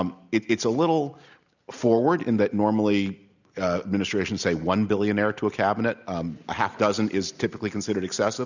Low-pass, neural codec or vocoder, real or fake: 7.2 kHz; none; real